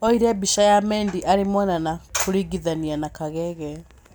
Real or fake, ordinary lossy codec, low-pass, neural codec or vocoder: real; none; none; none